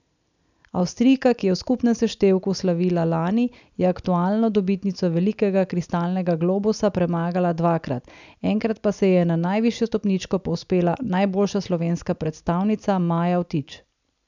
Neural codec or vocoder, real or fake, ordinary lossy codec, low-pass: none; real; none; 7.2 kHz